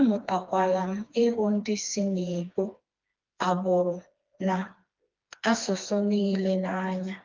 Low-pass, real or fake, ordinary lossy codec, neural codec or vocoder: 7.2 kHz; fake; Opus, 32 kbps; codec, 16 kHz, 2 kbps, FreqCodec, smaller model